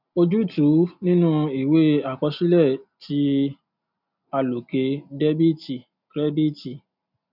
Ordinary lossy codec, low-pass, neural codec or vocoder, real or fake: none; 5.4 kHz; none; real